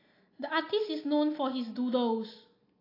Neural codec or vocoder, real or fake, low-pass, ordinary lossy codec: none; real; 5.4 kHz; AAC, 32 kbps